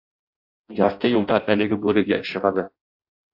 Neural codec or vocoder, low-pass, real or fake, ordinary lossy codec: codec, 16 kHz in and 24 kHz out, 0.6 kbps, FireRedTTS-2 codec; 5.4 kHz; fake; AAC, 48 kbps